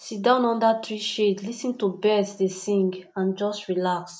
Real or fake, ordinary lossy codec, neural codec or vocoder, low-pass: real; none; none; none